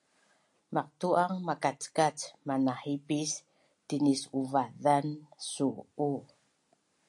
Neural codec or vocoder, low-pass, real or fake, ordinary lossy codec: none; 10.8 kHz; real; AAC, 64 kbps